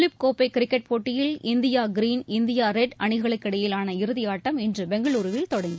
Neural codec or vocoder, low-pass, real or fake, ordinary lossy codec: none; none; real; none